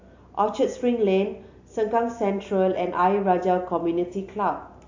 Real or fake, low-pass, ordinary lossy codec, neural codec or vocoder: real; 7.2 kHz; AAC, 48 kbps; none